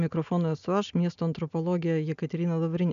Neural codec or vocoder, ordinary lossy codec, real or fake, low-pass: none; AAC, 96 kbps; real; 7.2 kHz